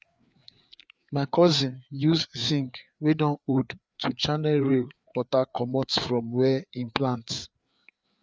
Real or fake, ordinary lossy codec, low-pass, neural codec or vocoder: fake; none; none; codec, 16 kHz, 4 kbps, FreqCodec, larger model